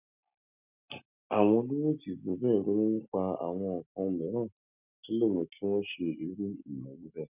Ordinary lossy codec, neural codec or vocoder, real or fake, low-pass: none; none; real; 3.6 kHz